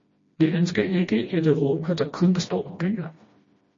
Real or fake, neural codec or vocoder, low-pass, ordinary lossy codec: fake; codec, 16 kHz, 1 kbps, FreqCodec, smaller model; 7.2 kHz; MP3, 32 kbps